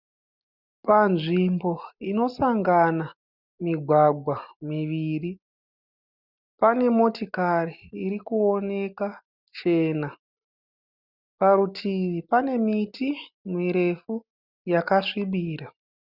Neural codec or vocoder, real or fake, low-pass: none; real; 5.4 kHz